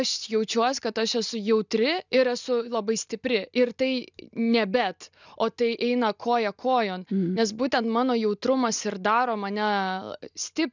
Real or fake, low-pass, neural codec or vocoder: real; 7.2 kHz; none